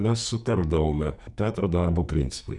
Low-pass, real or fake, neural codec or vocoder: 10.8 kHz; fake; codec, 44.1 kHz, 2.6 kbps, SNAC